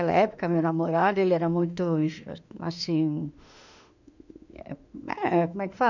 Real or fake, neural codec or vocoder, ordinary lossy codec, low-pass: fake; autoencoder, 48 kHz, 32 numbers a frame, DAC-VAE, trained on Japanese speech; none; 7.2 kHz